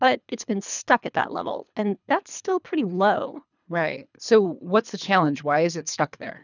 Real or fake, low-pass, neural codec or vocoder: fake; 7.2 kHz; codec, 24 kHz, 3 kbps, HILCodec